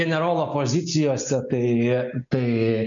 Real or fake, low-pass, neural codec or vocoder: real; 7.2 kHz; none